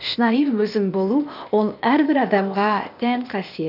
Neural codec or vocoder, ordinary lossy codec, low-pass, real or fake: codec, 16 kHz, 0.8 kbps, ZipCodec; none; 5.4 kHz; fake